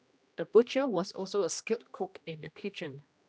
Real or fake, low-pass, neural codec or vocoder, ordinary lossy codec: fake; none; codec, 16 kHz, 1 kbps, X-Codec, HuBERT features, trained on general audio; none